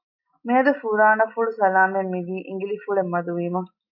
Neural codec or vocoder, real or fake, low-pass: none; real; 5.4 kHz